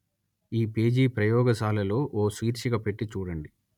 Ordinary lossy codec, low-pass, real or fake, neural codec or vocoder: none; 19.8 kHz; real; none